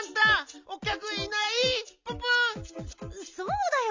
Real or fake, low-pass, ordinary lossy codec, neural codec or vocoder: real; 7.2 kHz; MP3, 64 kbps; none